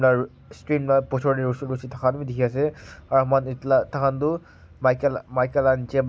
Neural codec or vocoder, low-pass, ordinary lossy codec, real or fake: none; none; none; real